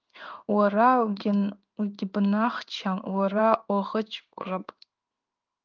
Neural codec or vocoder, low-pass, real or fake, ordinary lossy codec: codec, 16 kHz in and 24 kHz out, 1 kbps, XY-Tokenizer; 7.2 kHz; fake; Opus, 24 kbps